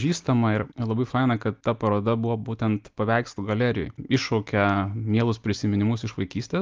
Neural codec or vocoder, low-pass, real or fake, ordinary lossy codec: none; 7.2 kHz; real; Opus, 24 kbps